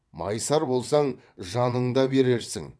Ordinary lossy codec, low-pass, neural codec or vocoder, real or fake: none; none; vocoder, 22.05 kHz, 80 mel bands, WaveNeXt; fake